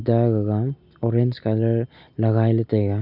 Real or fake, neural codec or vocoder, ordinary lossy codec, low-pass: real; none; none; 5.4 kHz